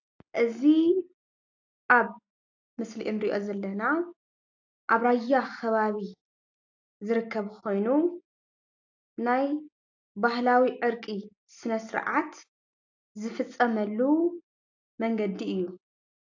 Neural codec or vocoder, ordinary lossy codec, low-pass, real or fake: none; AAC, 48 kbps; 7.2 kHz; real